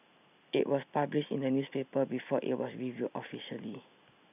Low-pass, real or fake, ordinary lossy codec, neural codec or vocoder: 3.6 kHz; real; none; none